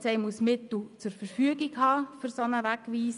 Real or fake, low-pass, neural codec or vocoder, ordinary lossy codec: fake; 10.8 kHz; vocoder, 24 kHz, 100 mel bands, Vocos; none